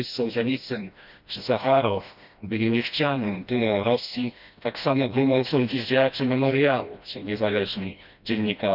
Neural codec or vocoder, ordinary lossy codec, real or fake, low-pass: codec, 16 kHz, 1 kbps, FreqCodec, smaller model; none; fake; 5.4 kHz